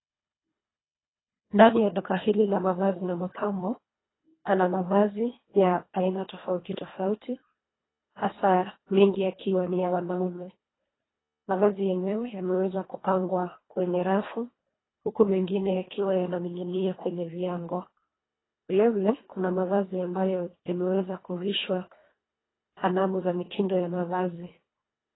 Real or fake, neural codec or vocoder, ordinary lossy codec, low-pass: fake; codec, 24 kHz, 1.5 kbps, HILCodec; AAC, 16 kbps; 7.2 kHz